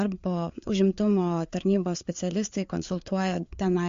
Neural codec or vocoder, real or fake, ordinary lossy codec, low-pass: codec, 16 kHz, 4 kbps, FreqCodec, larger model; fake; MP3, 48 kbps; 7.2 kHz